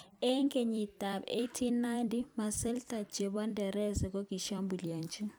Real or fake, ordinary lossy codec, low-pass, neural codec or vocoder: fake; none; none; vocoder, 44.1 kHz, 128 mel bands every 256 samples, BigVGAN v2